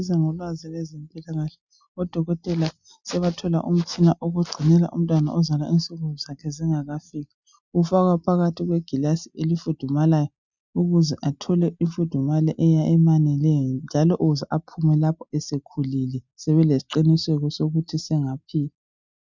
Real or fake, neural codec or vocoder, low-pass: real; none; 7.2 kHz